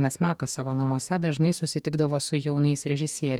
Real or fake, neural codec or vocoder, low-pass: fake; codec, 44.1 kHz, 2.6 kbps, DAC; 19.8 kHz